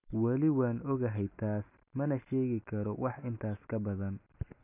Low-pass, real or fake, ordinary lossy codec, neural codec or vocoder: 3.6 kHz; real; none; none